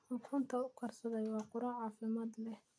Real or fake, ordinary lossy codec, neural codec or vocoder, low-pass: real; none; none; none